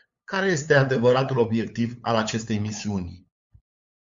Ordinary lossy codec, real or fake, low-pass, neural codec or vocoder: Opus, 64 kbps; fake; 7.2 kHz; codec, 16 kHz, 8 kbps, FunCodec, trained on LibriTTS, 25 frames a second